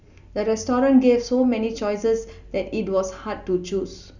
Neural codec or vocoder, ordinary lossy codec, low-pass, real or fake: none; none; 7.2 kHz; real